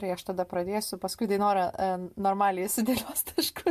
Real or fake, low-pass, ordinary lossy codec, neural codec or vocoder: real; 14.4 kHz; MP3, 64 kbps; none